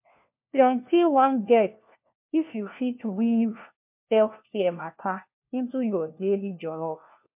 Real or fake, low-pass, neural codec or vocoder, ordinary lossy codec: fake; 3.6 kHz; codec, 16 kHz, 1 kbps, FunCodec, trained on LibriTTS, 50 frames a second; MP3, 32 kbps